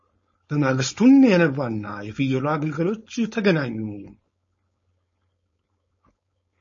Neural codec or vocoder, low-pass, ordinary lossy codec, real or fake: codec, 16 kHz, 4.8 kbps, FACodec; 7.2 kHz; MP3, 32 kbps; fake